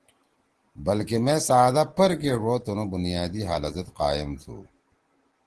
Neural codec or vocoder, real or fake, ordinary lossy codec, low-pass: none; real; Opus, 16 kbps; 10.8 kHz